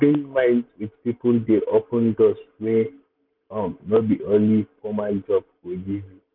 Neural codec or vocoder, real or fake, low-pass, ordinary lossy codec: none; real; 5.4 kHz; none